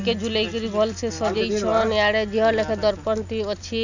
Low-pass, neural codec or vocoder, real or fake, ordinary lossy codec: 7.2 kHz; none; real; none